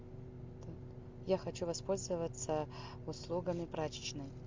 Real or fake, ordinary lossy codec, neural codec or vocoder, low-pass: real; MP3, 64 kbps; none; 7.2 kHz